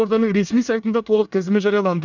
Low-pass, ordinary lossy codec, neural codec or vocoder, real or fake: 7.2 kHz; none; codec, 24 kHz, 1 kbps, SNAC; fake